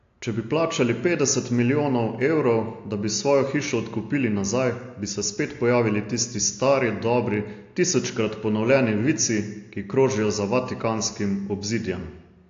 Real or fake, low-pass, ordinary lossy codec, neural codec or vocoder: real; 7.2 kHz; AAC, 48 kbps; none